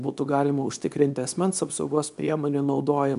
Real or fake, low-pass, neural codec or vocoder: fake; 10.8 kHz; codec, 24 kHz, 0.9 kbps, WavTokenizer, small release